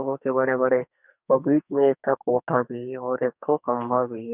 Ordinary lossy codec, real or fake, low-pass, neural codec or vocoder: none; fake; 3.6 kHz; codec, 44.1 kHz, 2.6 kbps, SNAC